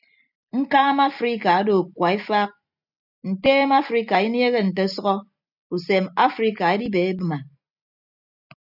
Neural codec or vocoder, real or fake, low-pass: none; real; 5.4 kHz